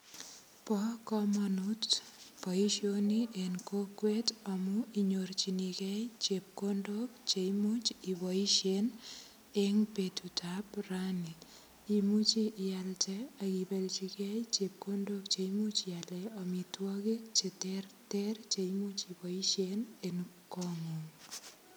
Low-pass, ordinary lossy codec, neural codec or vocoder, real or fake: none; none; none; real